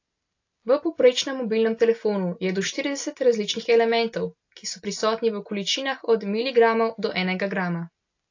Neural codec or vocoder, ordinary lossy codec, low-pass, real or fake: none; AAC, 48 kbps; 7.2 kHz; real